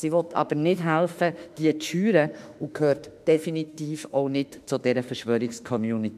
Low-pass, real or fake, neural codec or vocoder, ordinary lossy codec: 14.4 kHz; fake; autoencoder, 48 kHz, 32 numbers a frame, DAC-VAE, trained on Japanese speech; none